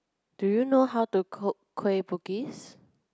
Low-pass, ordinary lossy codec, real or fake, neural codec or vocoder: none; none; real; none